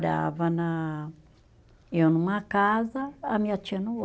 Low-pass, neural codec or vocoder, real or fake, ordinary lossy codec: none; none; real; none